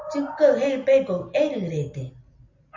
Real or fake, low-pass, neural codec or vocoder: real; 7.2 kHz; none